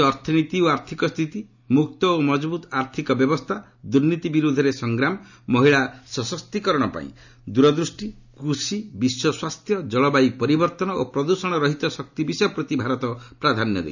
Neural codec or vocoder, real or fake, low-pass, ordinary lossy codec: none; real; 7.2 kHz; none